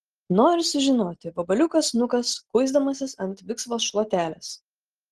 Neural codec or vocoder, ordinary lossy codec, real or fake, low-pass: none; Opus, 16 kbps; real; 10.8 kHz